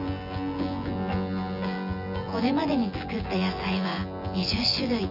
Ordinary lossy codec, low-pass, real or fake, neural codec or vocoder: none; 5.4 kHz; fake; vocoder, 24 kHz, 100 mel bands, Vocos